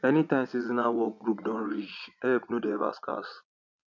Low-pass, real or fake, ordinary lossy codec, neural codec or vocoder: 7.2 kHz; fake; none; vocoder, 22.05 kHz, 80 mel bands, Vocos